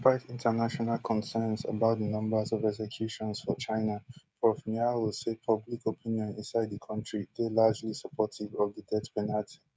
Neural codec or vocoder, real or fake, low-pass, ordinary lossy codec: codec, 16 kHz, 16 kbps, FreqCodec, smaller model; fake; none; none